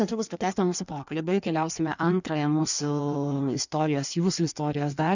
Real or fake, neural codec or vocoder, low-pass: fake; codec, 16 kHz in and 24 kHz out, 1.1 kbps, FireRedTTS-2 codec; 7.2 kHz